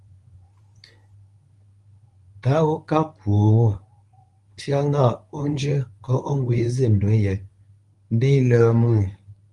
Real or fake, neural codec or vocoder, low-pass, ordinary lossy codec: fake; codec, 24 kHz, 0.9 kbps, WavTokenizer, medium speech release version 1; 10.8 kHz; Opus, 32 kbps